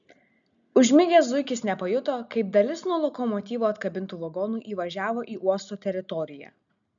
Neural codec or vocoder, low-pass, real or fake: none; 7.2 kHz; real